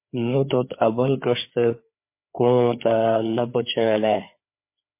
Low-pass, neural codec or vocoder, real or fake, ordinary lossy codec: 3.6 kHz; codec, 16 kHz, 4 kbps, FreqCodec, larger model; fake; MP3, 24 kbps